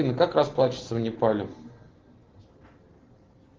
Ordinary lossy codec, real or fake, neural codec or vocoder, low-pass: Opus, 16 kbps; real; none; 7.2 kHz